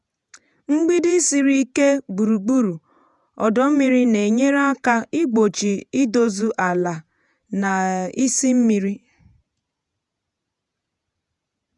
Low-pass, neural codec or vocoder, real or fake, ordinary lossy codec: 10.8 kHz; vocoder, 48 kHz, 128 mel bands, Vocos; fake; none